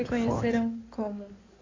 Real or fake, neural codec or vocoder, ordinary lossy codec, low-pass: fake; codec, 16 kHz in and 24 kHz out, 2.2 kbps, FireRedTTS-2 codec; AAC, 32 kbps; 7.2 kHz